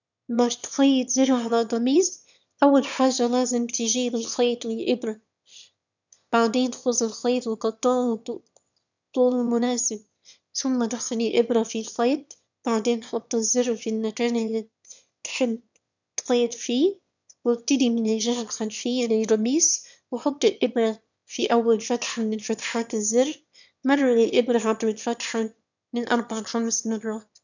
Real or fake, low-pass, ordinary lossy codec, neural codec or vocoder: fake; 7.2 kHz; none; autoencoder, 22.05 kHz, a latent of 192 numbers a frame, VITS, trained on one speaker